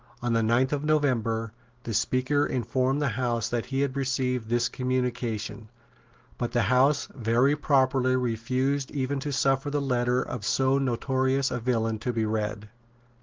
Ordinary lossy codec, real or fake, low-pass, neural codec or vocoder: Opus, 16 kbps; real; 7.2 kHz; none